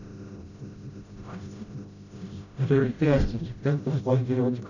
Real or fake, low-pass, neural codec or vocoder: fake; 7.2 kHz; codec, 16 kHz, 0.5 kbps, FreqCodec, smaller model